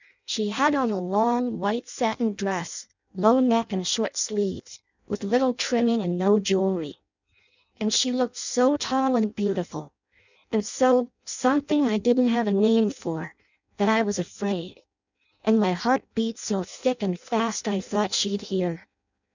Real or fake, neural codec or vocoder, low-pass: fake; codec, 16 kHz in and 24 kHz out, 0.6 kbps, FireRedTTS-2 codec; 7.2 kHz